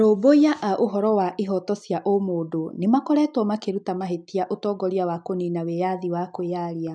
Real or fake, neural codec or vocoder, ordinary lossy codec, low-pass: real; none; none; 9.9 kHz